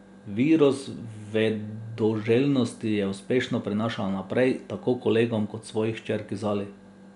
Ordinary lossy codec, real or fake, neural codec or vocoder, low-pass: none; real; none; 10.8 kHz